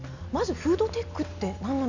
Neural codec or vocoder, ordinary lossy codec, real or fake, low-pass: none; none; real; 7.2 kHz